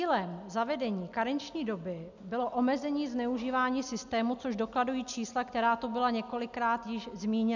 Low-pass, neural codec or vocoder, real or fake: 7.2 kHz; none; real